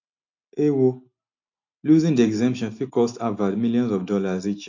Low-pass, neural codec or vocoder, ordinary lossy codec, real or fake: 7.2 kHz; none; AAC, 48 kbps; real